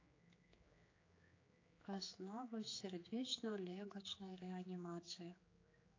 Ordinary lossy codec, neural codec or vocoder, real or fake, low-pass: AAC, 32 kbps; codec, 16 kHz, 4 kbps, X-Codec, HuBERT features, trained on general audio; fake; 7.2 kHz